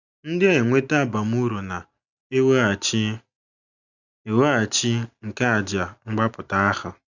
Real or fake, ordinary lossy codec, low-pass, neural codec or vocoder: real; none; 7.2 kHz; none